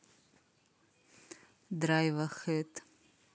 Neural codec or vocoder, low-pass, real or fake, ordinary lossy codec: none; none; real; none